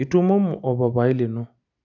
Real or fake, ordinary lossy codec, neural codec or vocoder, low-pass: real; none; none; 7.2 kHz